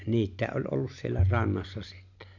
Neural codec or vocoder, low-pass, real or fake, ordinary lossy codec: none; 7.2 kHz; real; none